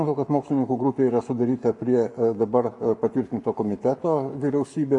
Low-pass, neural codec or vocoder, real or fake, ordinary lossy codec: 10.8 kHz; codec, 44.1 kHz, 7.8 kbps, Pupu-Codec; fake; MP3, 64 kbps